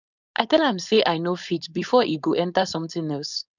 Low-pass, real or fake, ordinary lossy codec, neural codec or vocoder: 7.2 kHz; fake; none; codec, 16 kHz, 4.8 kbps, FACodec